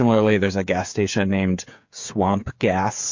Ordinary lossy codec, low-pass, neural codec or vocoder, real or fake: MP3, 48 kbps; 7.2 kHz; codec, 16 kHz, 8 kbps, FreqCodec, smaller model; fake